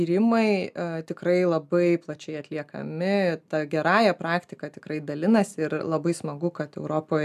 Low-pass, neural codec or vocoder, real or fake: 14.4 kHz; none; real